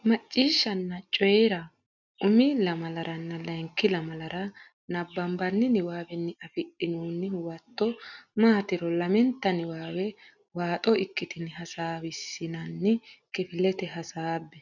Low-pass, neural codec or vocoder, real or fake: 7.2 kHz; none; real